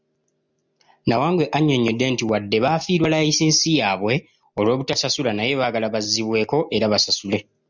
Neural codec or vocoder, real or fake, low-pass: none; real; 7.2 kHz